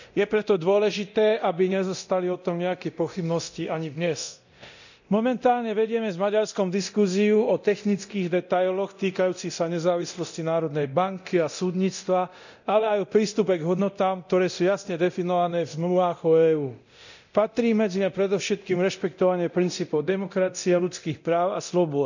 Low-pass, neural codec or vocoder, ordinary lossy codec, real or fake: 7.2 kHz; codec, 24 kHz, 0.9 kbps, DualCodec; none; fake